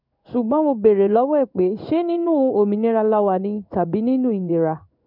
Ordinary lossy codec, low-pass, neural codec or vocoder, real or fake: none; 5.4 kHz; codec, 16 kHz in and 24 kHz out, 1 kbps, XY-Tokenizer; fake